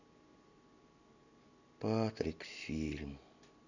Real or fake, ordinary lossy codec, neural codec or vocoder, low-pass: real; none; none; 7.2 kHz